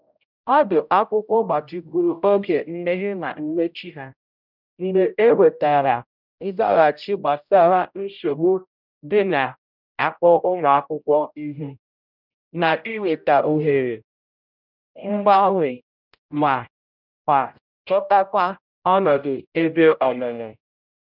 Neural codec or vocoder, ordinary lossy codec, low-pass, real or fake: codec, 16 kHz, 0.5 kbps, X-Codec, HuBERT features, trained on general audio; none; 5.4 kHz; fake